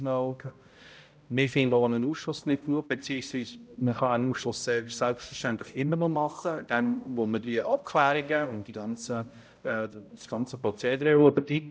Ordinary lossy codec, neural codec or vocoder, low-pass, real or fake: none; codec, 16 kHz, 0.5 kbps, X-Codec, HuBERT features, trained on balanced general audio; none; fake